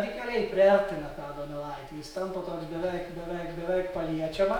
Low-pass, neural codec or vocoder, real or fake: 19.8 kHz; autoencoder, 48 kHz, 128 numbers a frame, DAC-VAE, trained on Japanese speech; fake